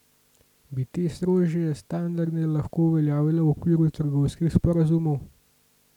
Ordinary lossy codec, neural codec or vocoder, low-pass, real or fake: none; none; 19.8 kHz; real